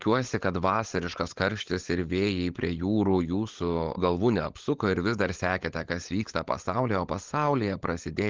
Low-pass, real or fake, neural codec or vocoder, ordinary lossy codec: 7.2 kHz; fake; codec, 16 kHz, 16 kbps, FunCodec, trained on LibriTTS, 50 frames a second; Opus, 16 kbps